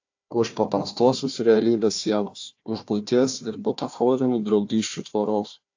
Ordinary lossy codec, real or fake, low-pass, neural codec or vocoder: AAC, 48 kbps; fake; 7.2 kHz; codec, 16 kHz, 1 kbps, FunCodec, trained on Chinese and English, 50 frames a second